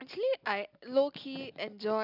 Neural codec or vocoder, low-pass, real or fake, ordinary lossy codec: none; 5.4 kHz; real; none